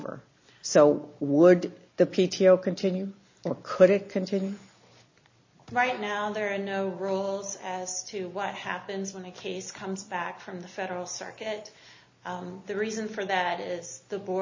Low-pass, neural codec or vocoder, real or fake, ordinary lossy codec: 7.2 kHz; none; real; MP3, 32 kbps